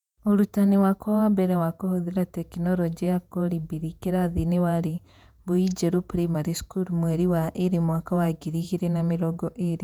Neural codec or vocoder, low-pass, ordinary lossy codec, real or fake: vocoder, 48 kHz, 128 mel bands, Vocos; 19.8 kHz; none; fake